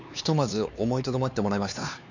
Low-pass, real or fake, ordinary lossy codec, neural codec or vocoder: 7.2 kHz; fake; none; codec, 16 kHz, 4 kbps, X-Codec, HuBERT features, trained on LibriSpeech